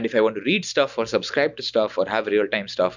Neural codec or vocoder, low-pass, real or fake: none; 7.2 kHz; real